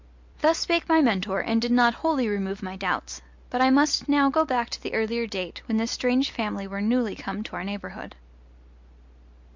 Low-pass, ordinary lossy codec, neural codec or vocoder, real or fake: 7.2 kHz; MP3, 64 kbps; none; real